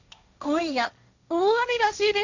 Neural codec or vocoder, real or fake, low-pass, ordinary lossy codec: codec, 16 kHz, 1.1 kbps, Voila-Tokenizer; fake; 7.2 kHz; none